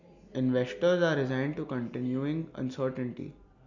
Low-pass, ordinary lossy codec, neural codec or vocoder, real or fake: 7.2 kHz; none; none; real